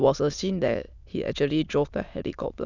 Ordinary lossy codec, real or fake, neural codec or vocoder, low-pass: none; fake; autoencoder, 22.05 kHz, a latent of 192 numbers a frame, VITS, trained on many speakers; 7.2 kHz